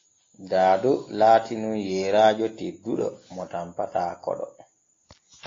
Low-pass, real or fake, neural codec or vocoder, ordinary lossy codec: 7.2 kHz; real; none; AAC, 32 kbps